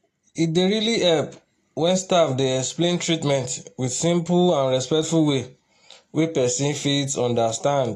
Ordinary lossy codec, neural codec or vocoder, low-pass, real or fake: AAC, 48 kbps; none; 14.4 kHz; real